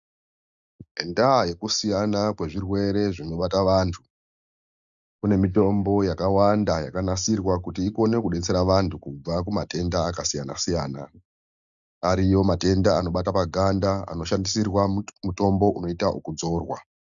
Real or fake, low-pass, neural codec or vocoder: real; 7.2 kHz; none